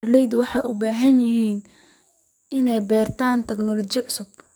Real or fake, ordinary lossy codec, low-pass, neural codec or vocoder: fake; none; none; codec, 44.1 kHz, 2.6 kbps, SNAC